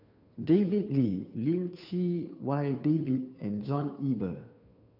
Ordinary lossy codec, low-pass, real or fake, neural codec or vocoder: none; 5.4 kHz; fake; codec, 16 kHz, 2 kbps, FunCodec, trained on Chinese and English, 25 frames a second